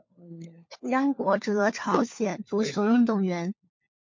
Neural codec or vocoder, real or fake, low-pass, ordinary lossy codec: codec, 16 kHz, 4 kbps, FunCodec, trained on LibriTTS, 50 frames a second; fake; 7.2 kHz; MP3, 48 kbps